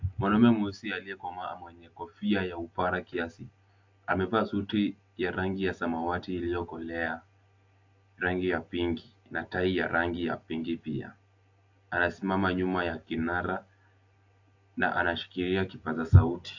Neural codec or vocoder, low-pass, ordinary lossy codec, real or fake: none; 7.2 kHz; AAC, 48 kbps; real